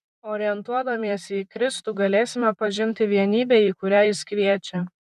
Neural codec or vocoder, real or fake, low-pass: vocoder, 44.1 kHz, 128 mel bands, Pupu-Vocoder; fake; 14.4 kHz